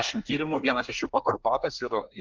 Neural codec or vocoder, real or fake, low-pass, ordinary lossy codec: codec, 24 kHz, 1 kbps, SNAC; fake; 7.2 kHz; Opus, 32 kbps